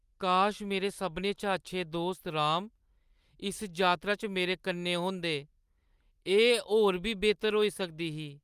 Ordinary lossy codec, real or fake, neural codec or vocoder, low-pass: Opus, 32 kbps; real; none; 14.4 kHz